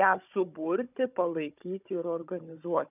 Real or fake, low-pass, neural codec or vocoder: fake; 3.6 kHz; codec, 16 kHz, 16 kbps, FunCodec, trained on Chinese and English, 50 frames a second